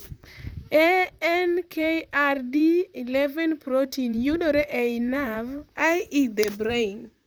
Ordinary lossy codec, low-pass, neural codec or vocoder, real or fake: none; none; vocoder, 44.1 kHz, 128 mel bands, Pupu-Vocoder; fake